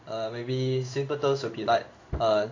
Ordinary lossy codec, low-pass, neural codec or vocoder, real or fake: AAC, 48 kbps; 7.2 kHz; codec, 16 kHz in and 24 kHz out, 1 kbps, XY-Tokenizer; fake